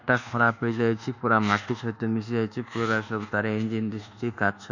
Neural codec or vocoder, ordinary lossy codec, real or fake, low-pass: codec, 24 kHz, 1.2 kbps, DualCodec; none; fake; 7.2 kHz